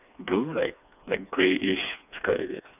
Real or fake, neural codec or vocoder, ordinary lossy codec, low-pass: fake; codec, 16 kHz, 2 kbps, FreqCodec, smaller model; none; 3.6 kHz